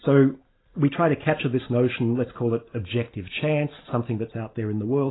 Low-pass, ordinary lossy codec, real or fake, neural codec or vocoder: 7.2 kHz; AAC, 16 kbps; real; none